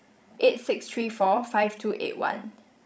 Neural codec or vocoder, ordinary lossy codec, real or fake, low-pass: codec, 16 kHz, 16 kbps, FreqCodec, larger model; none; fake; none